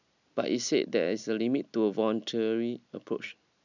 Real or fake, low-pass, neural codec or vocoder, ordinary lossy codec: real; 7.2 kHz; none; none